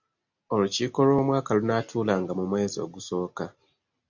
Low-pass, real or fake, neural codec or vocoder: 7.2 kHz; real; none